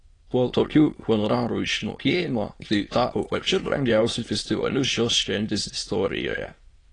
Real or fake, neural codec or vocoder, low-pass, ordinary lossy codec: fake; autoencoder, 22.05 kHz, a latent of 192 numbers a frame, VITS, trained on many speakers; 9.9 kHz; AAC, 32 kbps